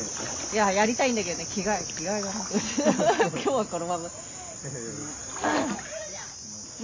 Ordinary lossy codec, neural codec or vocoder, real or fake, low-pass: MP3, 48 kbps; none; real; 7.2 kHz